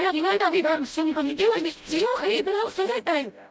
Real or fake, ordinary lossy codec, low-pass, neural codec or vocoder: fake; none; none; codec, 16 kHz, 0.5 kbps, FreqCodec, smaller model